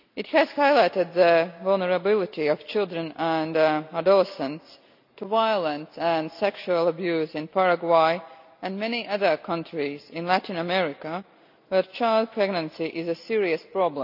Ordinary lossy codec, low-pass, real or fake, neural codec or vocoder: none; 5.4 kHz; real; none